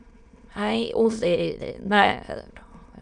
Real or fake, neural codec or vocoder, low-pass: fake; autoencoder, 22.05 kHz, a latent of 192 numbers a frame, VITS, trained on many speakers; 9.9 kHz